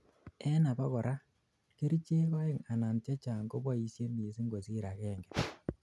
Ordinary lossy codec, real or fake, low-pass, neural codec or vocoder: none; real; none; none